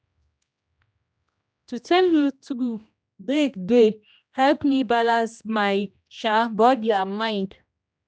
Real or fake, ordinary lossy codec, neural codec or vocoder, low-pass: fake; none; codec, 16 kHz, 1 kbps, X-Codec, HuBERT features, trained on general audio; none